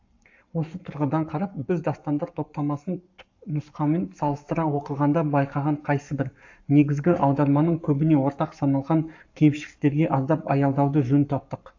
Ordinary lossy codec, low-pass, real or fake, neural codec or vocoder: AAC, 48 kbps; 7.2 kHz; fake; codec, 44.1 kHz, 7.8 kbps, Pupu-Codec